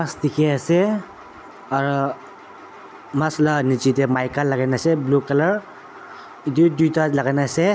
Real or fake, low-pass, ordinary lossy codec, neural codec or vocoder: real; none; none; none